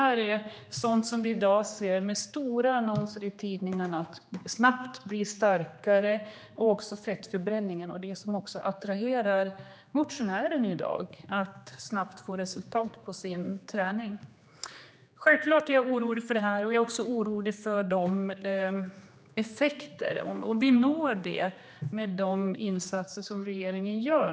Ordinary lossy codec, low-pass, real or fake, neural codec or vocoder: none; none; fake; codec, 16 kHz, 2 kbps, X-Codec, HuBERT features, trained on general audio